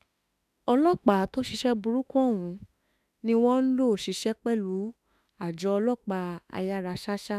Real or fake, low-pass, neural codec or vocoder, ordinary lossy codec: fake; 14.4 kHz; autoencoder, 48 kHz, 32 numbers a frame, DAC-VAE, trained on Japanese speech; none